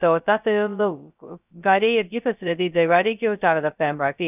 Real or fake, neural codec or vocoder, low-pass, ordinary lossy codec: fake; codec, 16 kHz, 0.2 kbps, FocalCodec; 3.6 kHz; none